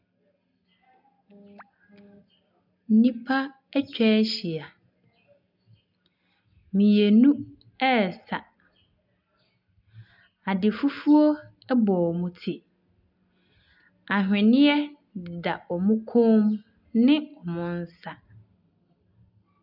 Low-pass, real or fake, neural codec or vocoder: 5.4 kHz; real; none